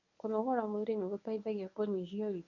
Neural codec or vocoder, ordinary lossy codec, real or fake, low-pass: codec, 24 kHz, 0.9 kbps, WavTokenizer, medium speech release version 1; none; fake; 7.2 kHz